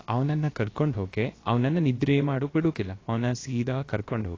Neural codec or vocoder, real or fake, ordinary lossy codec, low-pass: codec, 16 kHz, about 1 kbps, DyCAST, with the encoder's durations; fake; AAC, 32 kbps; 7.2 kHz